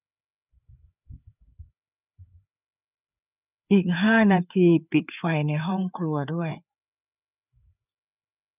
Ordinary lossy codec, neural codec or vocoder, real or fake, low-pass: none; codec, 16 kHz, 4 kbps, FreqCodec, larger model; fake; 3.6 kHz